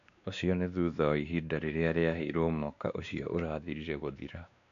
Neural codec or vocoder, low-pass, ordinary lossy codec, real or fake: codec, 16 kHz, 2 kbps, X-Codec, WavLM features, trained on Multilingual LibriSpeech; 7.2 kHz; none; fake